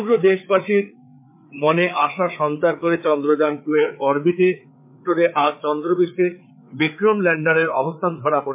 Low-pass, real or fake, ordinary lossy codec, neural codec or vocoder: 3.6 kHz; fake; MP3, 32 kbps; codec, 16 kHz, 4 kbps, FreqCodec, larger model